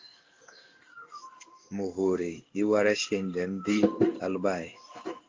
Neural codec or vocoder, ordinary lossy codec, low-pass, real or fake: codec, 16 kHz in and 24 kHz out, 1 kbps, XY-Tokenizer; Opus, 32 kbps; 7.2 kHz; fake